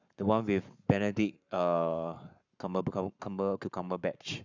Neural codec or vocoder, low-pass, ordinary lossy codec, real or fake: codec, 44.1 kHz, 7.8 kbps, Pupu-Codec; 7.2 kHz; none; fake